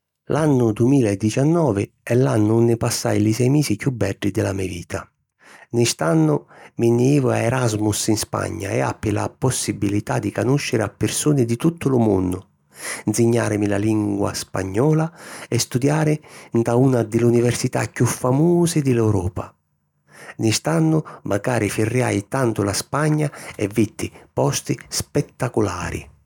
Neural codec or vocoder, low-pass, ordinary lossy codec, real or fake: none; 19.8 kHz; none; real